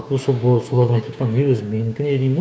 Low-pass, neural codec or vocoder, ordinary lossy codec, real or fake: none; codec, 16 kHz, 6 kbps, DAC; none; fake